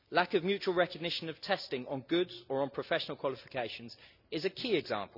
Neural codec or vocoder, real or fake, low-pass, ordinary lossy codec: none; real; 5.4 kHz; none